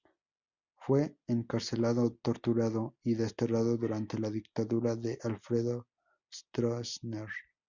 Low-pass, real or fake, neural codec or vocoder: 7.2 kHz; real; none